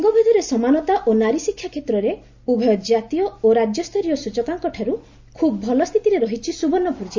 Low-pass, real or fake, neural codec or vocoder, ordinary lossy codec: 7.2 kHz; real; none; MP3, 64 kbps